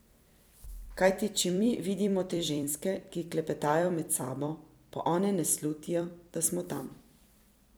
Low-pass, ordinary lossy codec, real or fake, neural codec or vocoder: none; none; fake; vocoder, 44.1 kHz, 128 mel bands every 256 samples, BigVGAN v2